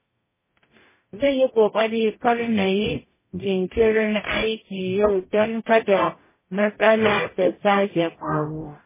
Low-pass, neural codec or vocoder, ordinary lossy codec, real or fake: 3.6 kHz; codec, 44.1 kHz, 0.9 kbps, DAC; MP3, 16 kbps; fake